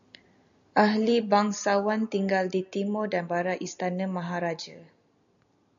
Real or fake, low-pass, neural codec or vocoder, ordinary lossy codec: real; 7.2 kHz; none; MP3, 96 kbps